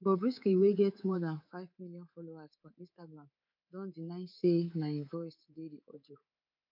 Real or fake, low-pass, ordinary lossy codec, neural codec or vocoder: fake; 5.4 kHz; none; codec, 24 kHz, 3.1 kbps, DualCodec